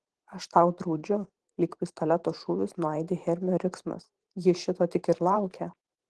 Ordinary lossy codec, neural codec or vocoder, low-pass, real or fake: Opus, 16 kbps; vocoder, 44.1 kHz, 128 mel bands every 512 samples, BigVGAN v2; 10.8 kHz; fake